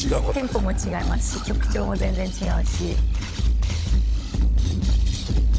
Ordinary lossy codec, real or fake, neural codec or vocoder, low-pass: none; fake; codec, 16 kHz, 16 kbps, FunCodec, trained on LibriTTS, 50 frames a second; none